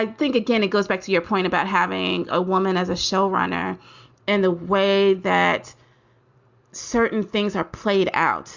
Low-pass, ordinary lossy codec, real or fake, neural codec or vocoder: 7.2 kHz; Opus, 64 kbps; real; none